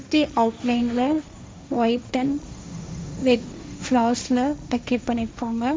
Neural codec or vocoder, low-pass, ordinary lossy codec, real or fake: codec, 16 kHz, 1.1 kbps, Voila-Tokenizer; none; none; fake